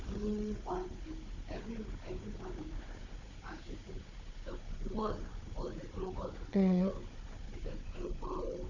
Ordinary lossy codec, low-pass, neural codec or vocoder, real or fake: none; 7.2 kHz; codec, 16 kHz, 4 kbps, FunCodec, trained on Chinese and English, 50 frames a second; fake